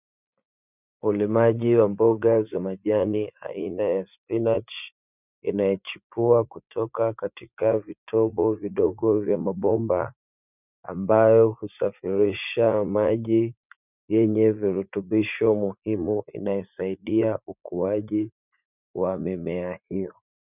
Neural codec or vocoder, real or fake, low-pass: vocoder, 44.1 kHz, 80 mel bands, Vocos; fake; 3.6 kHz